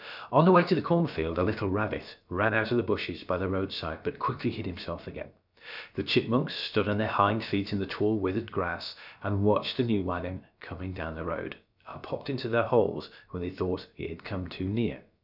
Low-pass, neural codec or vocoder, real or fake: 5.4 kHz; codec, 16 kHz, about 1 kbps, DyCAST, with the encoder's durations; fake